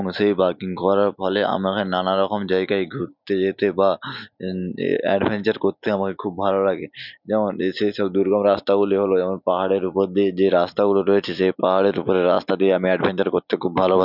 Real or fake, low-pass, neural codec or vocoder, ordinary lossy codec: real; 5.4 kHz; none; none